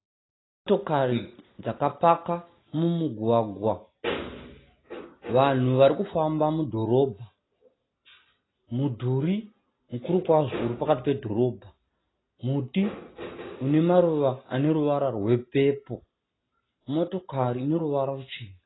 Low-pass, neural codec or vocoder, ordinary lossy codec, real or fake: 7.2 kHz; none; AAC, 16 kbps; real